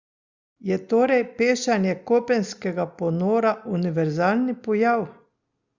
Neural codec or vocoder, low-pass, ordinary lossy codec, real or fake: none; 7.2 kHz; Opus, 64 kbps; real